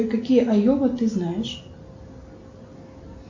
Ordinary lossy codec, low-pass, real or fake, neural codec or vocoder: MP3, 64 kbps; 7.2 kHz; real; none